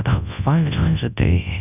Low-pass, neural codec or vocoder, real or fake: 3.6 kHz; codec, 24 kHz, 0.9 kbps, WavTokenizer, large speech release; fake